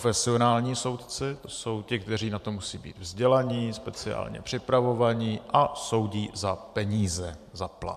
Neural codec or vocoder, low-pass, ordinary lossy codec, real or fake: vocoder, 44.1 kHz, 128 mel bands every 512 samples, BigVGAN v2; 14.4 kHz; MP3, 96 kbps; fake